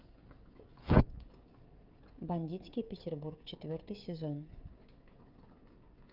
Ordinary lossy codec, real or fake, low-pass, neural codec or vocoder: Opus, 24 kbps; fake; 5.4 kHz; codec, 16 kHz, 16 kbps, FreqCodec, smaller model